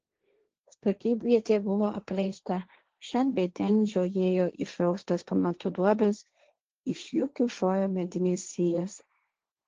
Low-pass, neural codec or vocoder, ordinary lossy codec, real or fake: 7.2 kHz; codec, 16 kHz, 1.1 kbps, Voila-Tokenizer; Opus, 16 kbps; fake